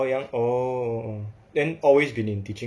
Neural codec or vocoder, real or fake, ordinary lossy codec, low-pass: none; real; none; none